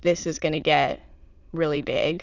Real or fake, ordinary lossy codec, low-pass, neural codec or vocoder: fake; Opus, 64 kbps; 7.2 kHz; autoencoder, 22.05 kHz, a latent of 192 numbers a frame, VITS, trained on many speakers